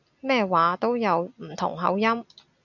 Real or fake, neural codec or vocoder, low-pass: real; none; 7.2 kHz